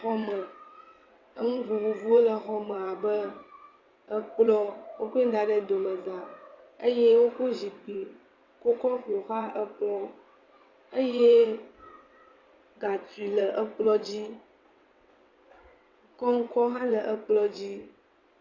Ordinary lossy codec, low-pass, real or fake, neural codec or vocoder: Opus, 64 kbps; 7.2 kHz; fake; vocoder, 22.05 kHz, 80 mel bands, WaveNeXt